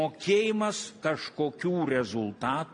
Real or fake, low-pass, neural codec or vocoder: real; 9.9 kHz; none